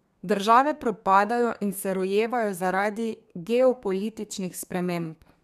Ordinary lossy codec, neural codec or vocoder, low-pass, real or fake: none; codec, 32 kHz, 1.9 kbps, SNAC; 14.4 kHz; fake